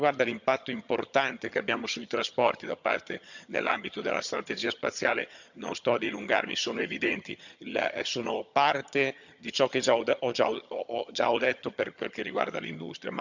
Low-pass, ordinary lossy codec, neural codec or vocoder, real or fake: 7.2 kHz; none; vocoder, 22.05 kHz, 80 mel bands, HiFi-GAN; fake